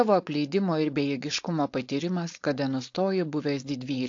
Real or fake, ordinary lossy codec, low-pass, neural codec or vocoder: fake; AAC, 48 kbps; 7.2 kHz; codec, 16 kHz, 4.8 kbps, FACodec